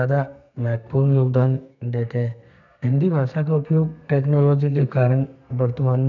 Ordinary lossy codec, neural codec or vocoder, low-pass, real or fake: none; codec, 32 kHz, 1.9 kbps, SNAC; 7.2 kHz; fake